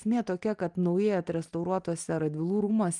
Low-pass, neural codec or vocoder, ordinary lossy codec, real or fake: 10.8 kHz; none; Opus, 32 kbps; real